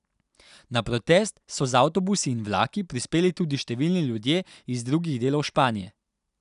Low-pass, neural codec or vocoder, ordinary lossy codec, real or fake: 10.8 kHz; none; none; real